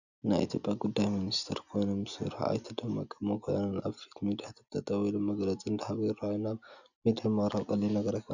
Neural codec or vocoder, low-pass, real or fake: none; 7.2 kHz; real